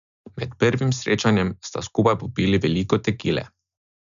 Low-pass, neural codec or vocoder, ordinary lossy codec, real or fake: 7.2 kHz; none; MP3, 96 kbps; real